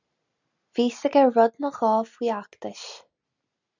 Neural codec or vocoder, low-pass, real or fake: none; 7.2 kHz; real